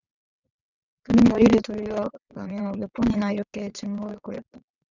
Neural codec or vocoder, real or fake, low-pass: vocoder, 22.05 kHz, 80 mel bands, WaveNeXt; fake; 7.2 kHz